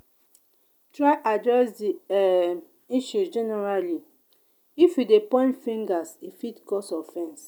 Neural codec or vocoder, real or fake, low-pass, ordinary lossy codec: none; real; none; none